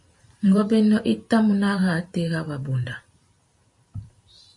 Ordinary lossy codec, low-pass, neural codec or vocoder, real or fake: MP3, 48 kbps; 10.8 kHz; vocoder, 44.1 kHz, 128 mel bands every 512 samples, BigVGAN v2; fake